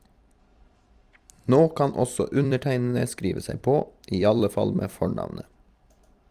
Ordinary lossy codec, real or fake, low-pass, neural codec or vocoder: Opus, 32 kbps; fake; 14.4 kHz; vocoder, 44.1 kHz, 128 mel bands every 256 samples, BigVGAN v2